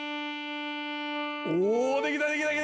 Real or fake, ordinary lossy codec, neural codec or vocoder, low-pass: real; none; none; none